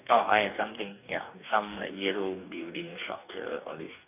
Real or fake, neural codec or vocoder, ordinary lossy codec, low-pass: fake; codec, 44.1 kHz, 2.6 kbps, DAC; none; 3.6 kHz